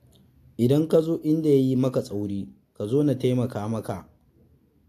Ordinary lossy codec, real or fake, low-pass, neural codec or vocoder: AAC, 64 kbps; real; 14.4 kHz; none